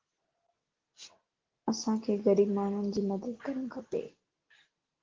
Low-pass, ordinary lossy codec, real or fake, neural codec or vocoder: 7.2 kHz; Opus, 16 kbps; real; none